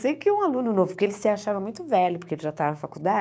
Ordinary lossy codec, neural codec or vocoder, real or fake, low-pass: none; codec, 16 kHz, 6 kbps, DAC; fake; none